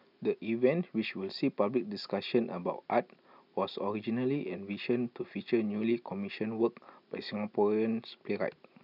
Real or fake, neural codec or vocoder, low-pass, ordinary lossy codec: real; none; 5.4 kHz; none